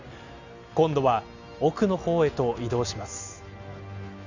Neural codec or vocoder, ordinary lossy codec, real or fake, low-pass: none; Opus, 64 kbps; real; 7.2 kHz